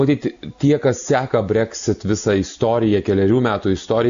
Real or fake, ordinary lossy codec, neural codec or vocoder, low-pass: real; MP3, 64 kbps; none; 7.2 kHz